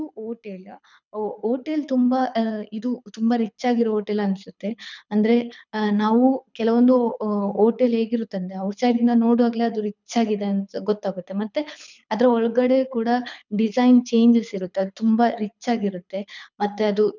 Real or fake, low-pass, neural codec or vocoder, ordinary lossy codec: fake; 7.2 kHz; codec, 24 kHz, 6 kbps, HILCodec; none